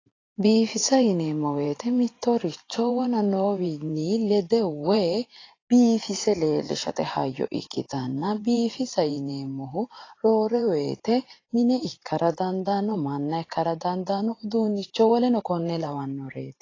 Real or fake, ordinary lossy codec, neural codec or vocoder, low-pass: fake; AAC, 32 kbps; vocoder, 44.1 kHz, 128 mel bands every 512 samples, BigVGAN v2; 7.2 kHz